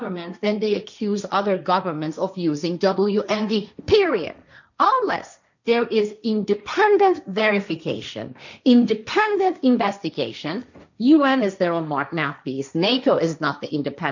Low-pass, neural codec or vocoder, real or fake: 7.2 kHz; codec, 16 kHz, 1.1 kbps, Voila-Tokenizer; fake